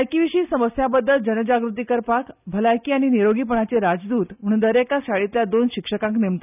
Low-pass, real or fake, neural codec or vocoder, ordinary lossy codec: 3.6 kHz; real; none; none